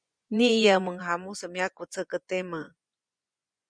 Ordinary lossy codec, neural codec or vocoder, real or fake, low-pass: MP3, 96 kbps; vocoder, 24 kHz, 100 mel bands, Vocos; fake; 9.9 kHz